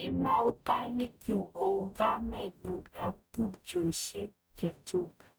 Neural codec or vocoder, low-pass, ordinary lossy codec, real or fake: codec, 44.1 kHz, 0.9 kbps, DAC; none; none; fake